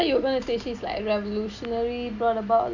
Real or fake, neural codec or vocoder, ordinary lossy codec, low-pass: real; none; none; 7.2 kHz